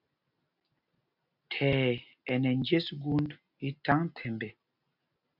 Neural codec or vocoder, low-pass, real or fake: none; 5.4 kHz; real